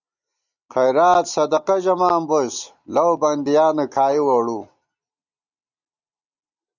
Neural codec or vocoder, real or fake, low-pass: none; real; 7.2 kHz